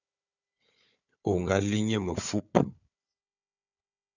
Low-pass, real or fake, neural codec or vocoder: 7.2 kHz; fake; codec, 16 kHz, 4 kbps, FunCodec, trained on Chinese and English, 50 frames a second